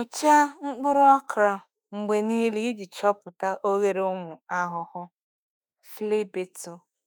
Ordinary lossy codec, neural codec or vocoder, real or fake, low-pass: none; autoencoder, 48 kHz, 32 numbers a frame, DAC-VAE, trained on Japanese speech; fake; none